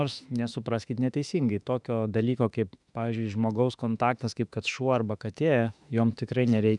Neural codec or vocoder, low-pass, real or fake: autoencoder, 48 kHz, 32 numbers a frame, DAC-VAE, trained on Japanese speech; 10.8 kHz; fake